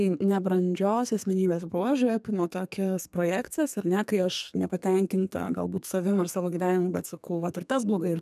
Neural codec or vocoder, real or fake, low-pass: codec, 32 kHz, 1.9 kbps, SNAC; fake; 14.4 kHz